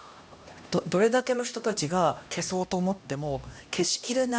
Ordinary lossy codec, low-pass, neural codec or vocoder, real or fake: none; none; codec, 16 kHz, 1 kbps, X-Codec, HuBERT features, trained on LibriSpeech; fake